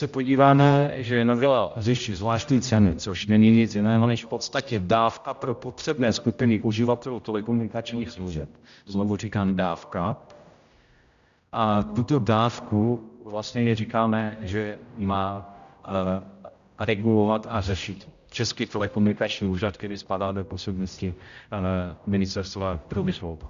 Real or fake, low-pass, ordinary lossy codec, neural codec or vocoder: fake; 7.2 kHz; Opus, 64 kbps; codec, 16 kHz, 0.5 kbps, X-Codec, HuBERT features, trained on general audio